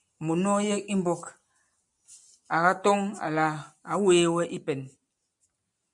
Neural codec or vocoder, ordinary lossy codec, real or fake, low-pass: none; MP3, 96 kbps; real; 10.8 kHz